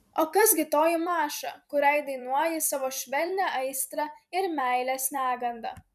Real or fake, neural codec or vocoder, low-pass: fake; vocoder, 44.1 kHz, 128 mel bands every 256 samples, BigVGAN v2; 14.4 kHz